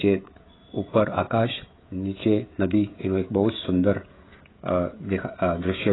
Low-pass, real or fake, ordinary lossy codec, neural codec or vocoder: 7.2 kHz; fake; AAC, 16 kbps; codec, 16 kHz, 16 kbps, FreqCodec, smaller model